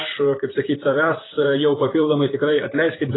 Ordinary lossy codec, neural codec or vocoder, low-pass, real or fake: AAC, 16 kbps; vocoder, 44.1 kHz, 128 mel bands, Pupu-Vocoder; 7.2 kHz; fake